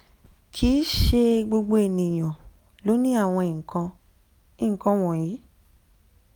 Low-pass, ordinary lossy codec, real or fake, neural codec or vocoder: none; none; real; none